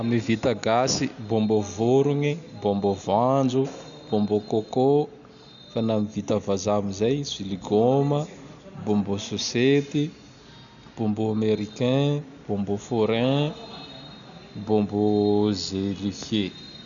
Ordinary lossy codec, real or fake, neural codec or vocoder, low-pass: none; real; none; 7.2 kHz